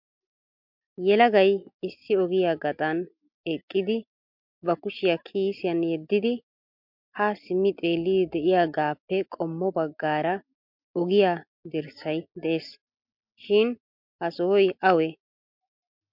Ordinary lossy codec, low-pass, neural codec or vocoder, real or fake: MP3, 48 kbps; 5.4 kHz; none; real